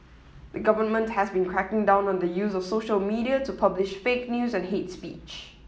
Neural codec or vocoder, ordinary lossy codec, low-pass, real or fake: none; none; none; real